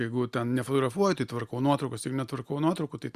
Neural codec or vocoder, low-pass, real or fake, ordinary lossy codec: none; 14.4 kHz; real; Opus, 64 kbps